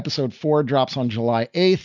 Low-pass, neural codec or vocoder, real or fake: 7.2 kHz; none; real